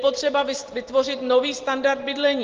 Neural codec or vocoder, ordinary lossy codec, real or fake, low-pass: none; Opus, 16 kbps; real; 7.2 kHz